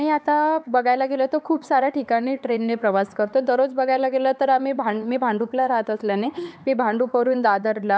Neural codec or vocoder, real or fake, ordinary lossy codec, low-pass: codec, 16 kHz, 4 kbps, X-Codec, HuBERT features, trained on LibriSpeech; fake; none; none